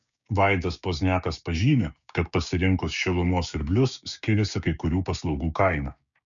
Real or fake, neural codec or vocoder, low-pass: fake; codec, 16 kHz, 6 kbps, DAC; 7.2 kHz